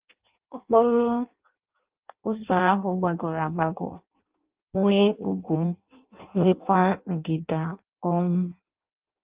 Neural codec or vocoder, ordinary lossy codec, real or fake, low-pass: codec, 16 kHz in and 24 kHz out, 0.6 kbps, FireRedTTS-2 codec; Opus, 32 kbps; fake; 3.6 kHz